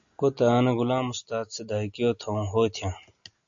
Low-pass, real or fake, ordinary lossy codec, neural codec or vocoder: 7.2 kHz; real; AAC, 64 kbps; none